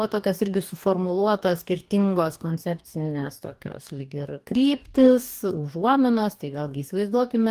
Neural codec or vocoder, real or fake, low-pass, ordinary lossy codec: codec, 44.1 kHz, 2.6 kbps, DAC; fake; 14.4 kHz; Opus, 24 kbps